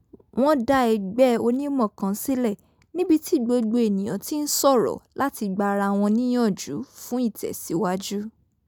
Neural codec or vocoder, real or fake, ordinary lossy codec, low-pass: none; real; none; none